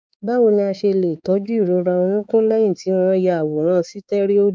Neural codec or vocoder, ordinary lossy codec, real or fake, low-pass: codec, 16 kHz, 4 kbps, X-Codec, HuBERT features, trained on balanced general audio; none; fake; none